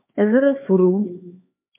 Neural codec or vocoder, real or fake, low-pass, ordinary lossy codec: codec, 16 kHz, 4 kbps, X-Codec, HuBERT features, trained on LibriSpeech; fake; 3.6 kHz; MP3, 24 kbps